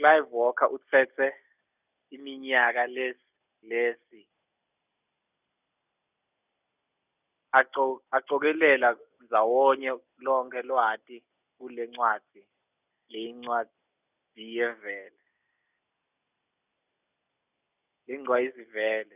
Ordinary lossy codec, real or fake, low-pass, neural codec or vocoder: none; real; 3.6 kHz; none